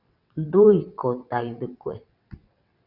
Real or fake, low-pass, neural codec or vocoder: fake; 5.4 kHz; vocoder, 22.05 kHz, 80 mel bands, WaveNeXt